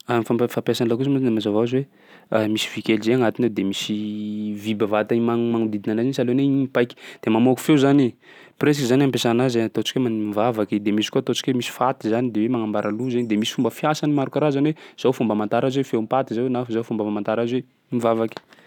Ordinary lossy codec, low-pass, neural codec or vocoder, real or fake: none; 19.8 kHz; none; real